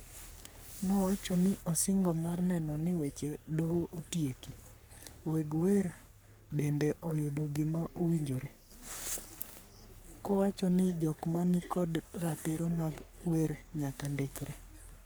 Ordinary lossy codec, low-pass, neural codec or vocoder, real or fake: none; none; codec, 44.1 kHz, 3.4 kbps, Pupu-Codec; fake